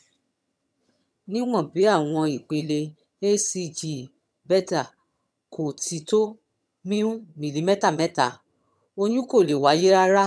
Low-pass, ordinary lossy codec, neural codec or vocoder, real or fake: none; none; vocoder, 22.05 kHz, 80 mel bands, HiFi-GAN; fake